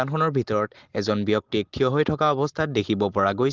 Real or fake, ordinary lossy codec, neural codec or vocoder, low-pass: real; Opus, 16 kbps; none; 7.2 kHz